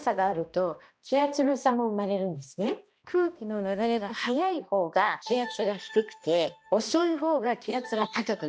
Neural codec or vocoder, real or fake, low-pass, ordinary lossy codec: codec, 16 kHz, 1 kbps, X-Codec, HuBERT features, trained on balanced general audio; fake; none; none